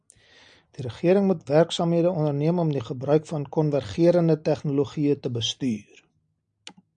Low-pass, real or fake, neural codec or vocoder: 9.9 kHz; real; none